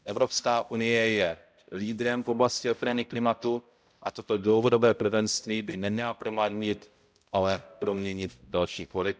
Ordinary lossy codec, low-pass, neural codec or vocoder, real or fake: none; none; codec, 16 kHz, 0.5 kbps, X-Codec, HuBERT features, trained on balanced general audio; fake